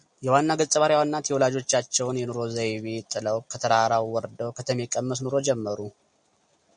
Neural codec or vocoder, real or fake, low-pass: none; real; 9.9 kHz